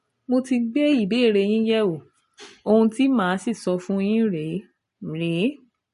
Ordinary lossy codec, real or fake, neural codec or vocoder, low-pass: MP3, 48 kbps; real; none; 14.4 kHz